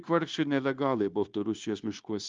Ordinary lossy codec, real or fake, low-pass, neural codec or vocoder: Opus, 32 kbps; fake; 7.2 kHz; codec, 16 kHz, 0.9 kbps, LongCat-Audio-Codec